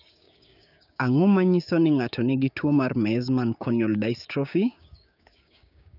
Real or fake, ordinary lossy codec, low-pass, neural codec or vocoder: fake; none; 5.4 kHz; codec, 16 kHz, 6 kbps, DAC